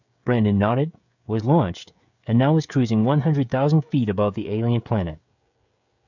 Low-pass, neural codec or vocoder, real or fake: 7.2 kHz; codec, 16 kHz, 16 kbps, FreqCodec, smaller model; fake